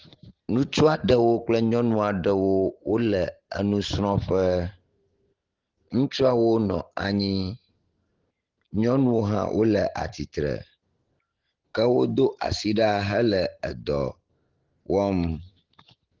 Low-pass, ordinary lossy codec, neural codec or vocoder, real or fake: 7.2 kHz; Opus, 16 kbps; none; real